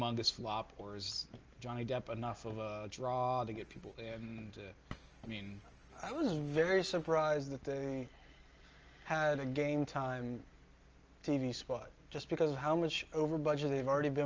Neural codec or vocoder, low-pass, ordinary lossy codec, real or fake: none; 7.2 kHz; Opus, 24 kbps; real